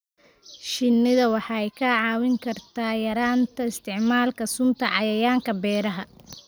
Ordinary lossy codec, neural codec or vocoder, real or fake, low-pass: none; none; real; none